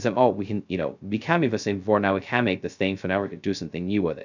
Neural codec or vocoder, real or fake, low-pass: codec, 16 kHz, 0.2 kbps, FocalCodec; fake; 7.2 kHz